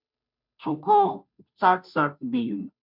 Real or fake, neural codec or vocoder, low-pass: fake; codec, 16 kHz, 0.5 kbps, FunCodec, trained on Chinese and English, 25 frames a second; 5.4 kHz